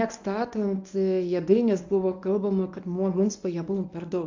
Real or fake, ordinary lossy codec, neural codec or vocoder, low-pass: fake; AAC, 48 kbps; codec, 24 kHz, 0.9 kbps, WavTokenizer, medium speech release version 1; 7.2 kHz